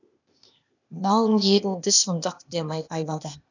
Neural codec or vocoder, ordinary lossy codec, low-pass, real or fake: codec, 16 kHz, 0.8 kbps, ZipCodec; none; 7.2 kHz; fake